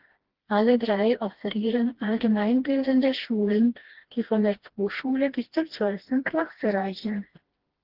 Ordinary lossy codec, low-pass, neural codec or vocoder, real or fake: Opus, 16 kbps; 5.4 kHz; codec, 16 kHz, 1 kbps, FreqCodec, smaller model; fake